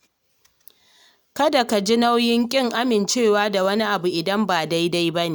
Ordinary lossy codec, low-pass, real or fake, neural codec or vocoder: none; none; real; none